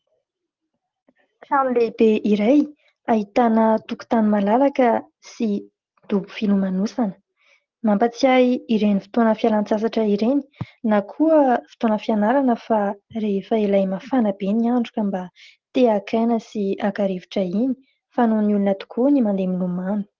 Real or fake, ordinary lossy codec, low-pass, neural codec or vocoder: real; Opus, 16 kbps; 7.2 kHz; none